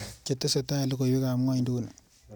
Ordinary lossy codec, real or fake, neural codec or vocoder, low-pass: none; real; none; none